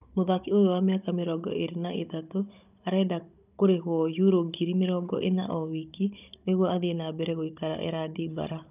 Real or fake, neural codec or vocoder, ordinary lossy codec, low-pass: fake; codec, 16 kHz, 16 kbps, FunCodec, trained on Chinese and English, 50 frames a second; none; 3.6 kHz